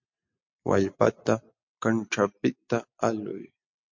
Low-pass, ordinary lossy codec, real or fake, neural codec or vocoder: 7.2 kHz; MP3, 48 kbps; real; none